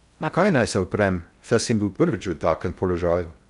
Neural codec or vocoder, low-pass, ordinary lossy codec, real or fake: codec, 16 kHz in and 24 kHz out, 0.6 kbps, FocalCodec, streaming, 2048 codes; 10.8 kHz; none; fake